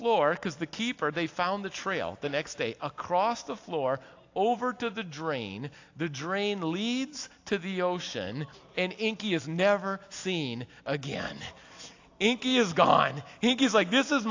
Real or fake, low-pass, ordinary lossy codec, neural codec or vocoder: real; 7.2 kHz; AAC, 48 kbps; none